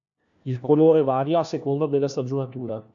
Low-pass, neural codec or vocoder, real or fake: 7.2 kHz; codec, 16 kHz, 1 kbps, FunCodec, trained on LibriTTS, 50 frames a second; fake